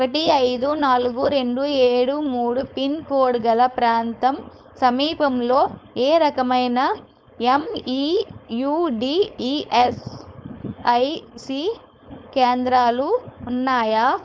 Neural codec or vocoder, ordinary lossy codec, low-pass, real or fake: codec, 16 kHz, 4.8 kbps, FACodec; none; none; fake